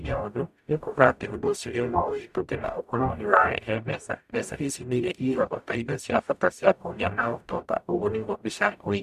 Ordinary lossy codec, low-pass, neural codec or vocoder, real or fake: AAC, 96 kbps; 14.4 kHz; codec, 44.1 kHz, 0.9 kbps, DAC; fake